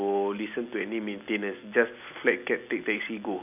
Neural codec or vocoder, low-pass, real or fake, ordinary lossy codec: none; 3.6 kHz; real; none